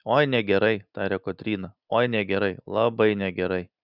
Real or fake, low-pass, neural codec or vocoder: real; 5.4 kHz; none